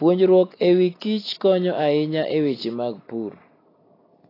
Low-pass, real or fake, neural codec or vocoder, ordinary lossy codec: 5.4 kHz; real; none; AAC, 24 kbps